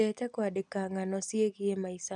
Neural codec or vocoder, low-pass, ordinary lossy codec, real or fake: none; 10.8 kHz; none; real